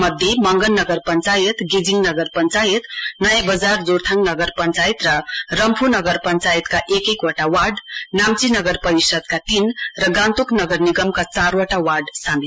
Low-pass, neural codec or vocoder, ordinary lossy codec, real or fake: none; none; none; real